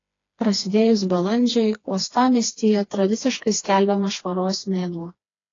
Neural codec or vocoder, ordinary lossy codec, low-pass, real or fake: codec, 16 kHz, 2 kbps, FreqCodec, smaller model; AAC, 32 kbps; 7.2 kHz; fake